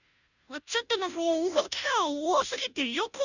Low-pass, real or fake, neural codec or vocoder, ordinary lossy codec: 7.2 kHz; fake; codec, 16 kHz, 0.5 kbps, FunCodec, trained on Chinese and English, 25 frames a second; none